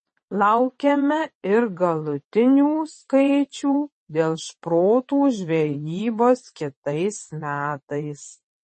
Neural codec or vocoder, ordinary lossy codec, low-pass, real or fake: vocoder, 22.05 kHz, 80 mel bands, WaveNeXt; MP3, 32 kbps; 9.9 kHz; fake